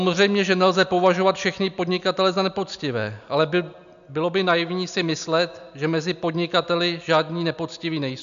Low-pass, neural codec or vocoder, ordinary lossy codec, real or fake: 7.2 kHz; none; AAC, 96 kbps; real